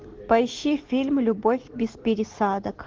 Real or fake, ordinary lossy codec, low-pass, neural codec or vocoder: real; Opus, 16 kbps; 7.2 kHz; none